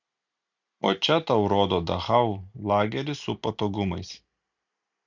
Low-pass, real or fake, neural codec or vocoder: 7.2 kHz; real; none